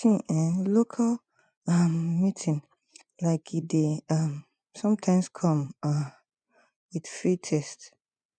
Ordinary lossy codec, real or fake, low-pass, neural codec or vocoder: none; fake; 9.9 kHz; vocoder, 24 kHz, 100 mel bands, Vocos